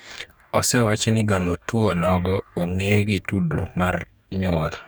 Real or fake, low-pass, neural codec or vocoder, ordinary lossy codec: fake; none; codec, 44.1 kHz, 2.6 kbps, DAC; none